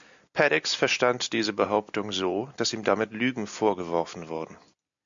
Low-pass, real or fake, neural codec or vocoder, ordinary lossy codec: 7.2 kHz; real; none; MP3, 64 kbps